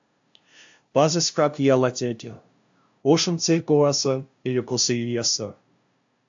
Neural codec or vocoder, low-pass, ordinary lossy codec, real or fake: codec, 16 kHz, 0.5 kbps, FunCodec, trained on LibriTTS, 25 frames a second; 7.2 kHz; AAC, 64 kbps; fake